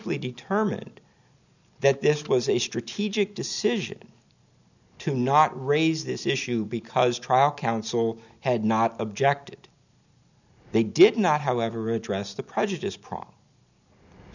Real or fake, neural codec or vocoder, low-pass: real; none; 7.2 kHz